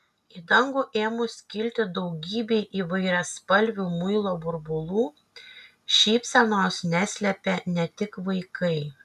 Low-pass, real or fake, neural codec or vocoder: 14.4 kHz; real; none